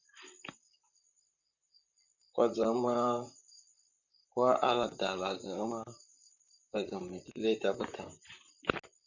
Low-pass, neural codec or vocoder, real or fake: 7.2 kHz; vocoder, 44.1 kHz, 128 mel bands, Pupu-Vocoder; fake